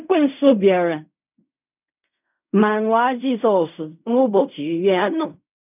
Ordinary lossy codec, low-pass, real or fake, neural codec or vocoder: none; 3.6 kHz; fake; codec, 16 kHz in and 24 kHz out, 0.4 kbps, LongCat-Audio-Codec, fine tuned four codebook decoder